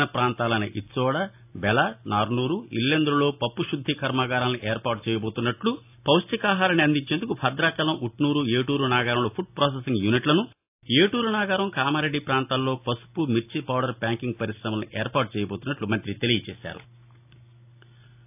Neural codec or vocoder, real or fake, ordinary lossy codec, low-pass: none; real; AAC, 32 kbps; 3.6 kHz